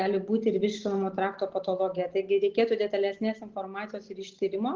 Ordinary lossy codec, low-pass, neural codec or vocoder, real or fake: Opus, 16 kbps; 7.2 kHz; none; real